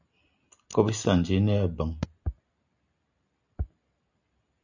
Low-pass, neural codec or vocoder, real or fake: 7.2 kHz; none; real